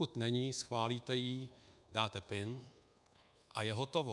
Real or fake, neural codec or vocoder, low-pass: fake; codec, 24 kHz, 1.2 kbps, DualCodec; 10.8 kHz